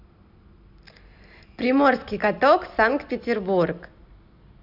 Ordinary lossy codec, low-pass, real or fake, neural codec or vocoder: none; 5.4 kHz; real; none